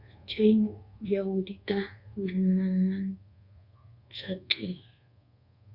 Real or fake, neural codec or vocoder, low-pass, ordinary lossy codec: fake; codec, 24 kHz, 1.2 kbps, DualCodec; 5.4 kHz; Opus, 64 kbps